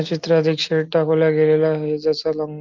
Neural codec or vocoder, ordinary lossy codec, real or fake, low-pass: none; Opus, 32 kbps; real; 7.2 kHz